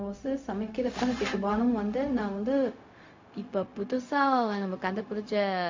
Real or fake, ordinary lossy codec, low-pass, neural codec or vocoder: fake; MP3, 48 kbps; 7.2 kHz; codec, 16 kHz, 0.4 kbps, LongCat-Audio-Codec